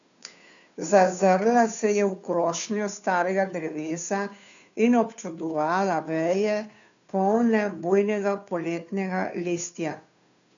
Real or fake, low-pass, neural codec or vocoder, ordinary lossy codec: fake; 7.2 kHz; codec, 16 kHz, 2 kbps, FunCodec, trained on Chinese and English, 25 frames a second; none